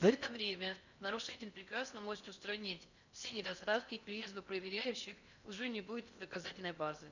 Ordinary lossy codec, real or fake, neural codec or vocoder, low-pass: none; fake; codec, 16 kHz in and 24 kHz out, 0.6 kbps, FocalCodec, streaming, 4096 codes; 7.2 kHz